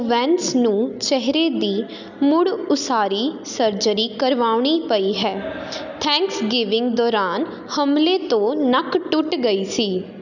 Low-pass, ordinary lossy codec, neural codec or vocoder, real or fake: 7.2 kHz; none; none; real